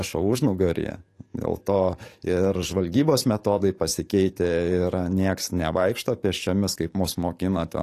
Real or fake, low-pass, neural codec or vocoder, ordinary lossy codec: fake; 14.4 kHz; codec, 44.1 kHz, 7.8 kbps, DAC; AAC, 48 kbps